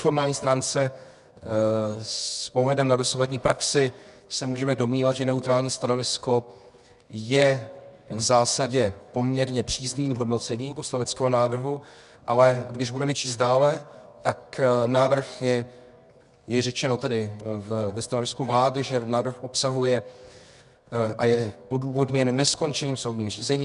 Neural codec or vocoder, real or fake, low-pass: codec, 24 kHz, 0.9 kbps, WavTokenizer, medium music audio release; fake; 10.8 kHz